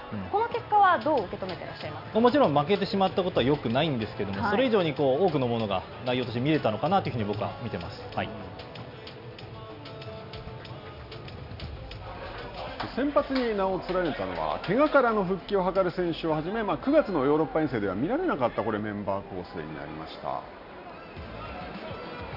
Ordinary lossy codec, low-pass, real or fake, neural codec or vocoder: none; 5.4 kHz; real; none